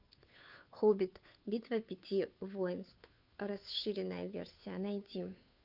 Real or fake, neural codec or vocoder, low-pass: fake; codec, 16 kHz, 2 kbps, FunCodec, trained on Chinese and English, 25 frames a second; 5.4 kHz